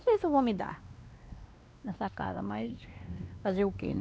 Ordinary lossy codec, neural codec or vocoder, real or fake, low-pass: none; codec, 16 kHz, 2 kbps, X-Codec, WavLM features, trained on Multilingual LibriSpeech; fake; none